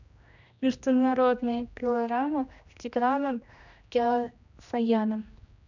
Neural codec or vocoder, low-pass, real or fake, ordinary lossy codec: codec, 16 kHz, 1 kbps, X-Codec, HuBERT features, trained on general audio; 7.2 kHz; fake; none